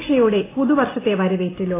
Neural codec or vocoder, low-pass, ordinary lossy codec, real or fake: none; 3.6 kHz; AAC, 16 kbps; real